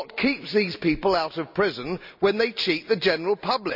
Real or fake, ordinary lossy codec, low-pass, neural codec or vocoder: real; none; 5.4 kHz; none